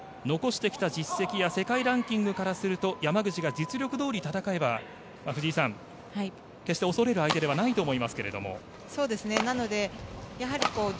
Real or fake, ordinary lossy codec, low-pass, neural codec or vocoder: real; none; none; none